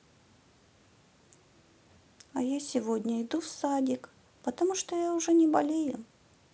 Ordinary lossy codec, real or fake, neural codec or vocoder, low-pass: none; real; none; none